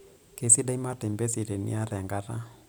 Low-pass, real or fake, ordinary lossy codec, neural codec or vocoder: none; real; none; none